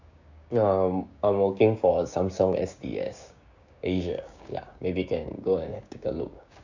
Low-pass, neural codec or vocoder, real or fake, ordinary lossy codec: 7.2 kHz; codec, 16 kHz, 6 kbps, DAC; fake; none